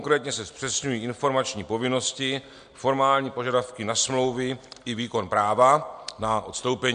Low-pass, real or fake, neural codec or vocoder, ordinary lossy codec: 9.9 kHz; real; none; MP3, 64 kbps